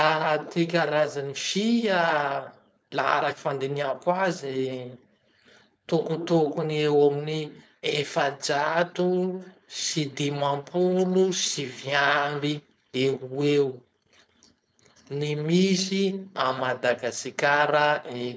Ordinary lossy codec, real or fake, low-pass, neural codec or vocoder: none; fake; none; codec, 16 kHz, 4.8 kbps, FACodec